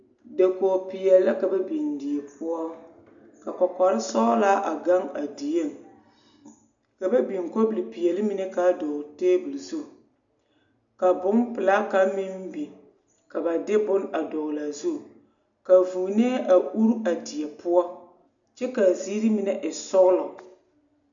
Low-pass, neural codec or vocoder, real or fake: 7.2 kHz; none; real